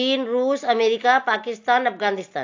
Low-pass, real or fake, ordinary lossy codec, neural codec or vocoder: 7.2 kHz; real; AAC, 48 kbps; none